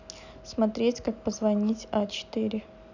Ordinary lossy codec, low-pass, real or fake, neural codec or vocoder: none; 7.2 kHz; real; none